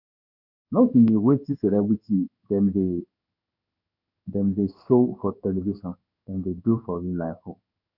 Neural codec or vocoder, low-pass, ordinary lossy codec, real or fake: codec, 16 kHz in and 24 kHz out, 1 kbps, XY-Tokenizer; 5.4 kHz; none; fake